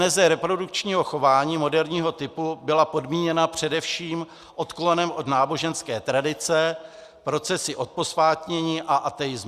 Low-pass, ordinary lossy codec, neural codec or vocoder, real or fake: 14.4 kHz; Opus, 64 kbps; none; real